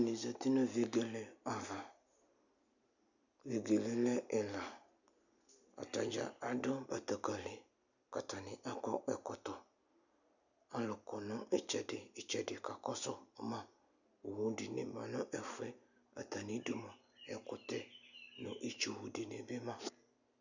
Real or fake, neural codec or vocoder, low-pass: real; none; 7.2 kHz